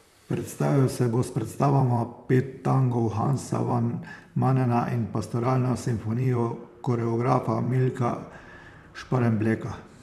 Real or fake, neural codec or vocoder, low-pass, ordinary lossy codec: fake; vocoder, 44.1 kHz, 128 mel bands, Pupu-Vocoder; 14.4 kHz; none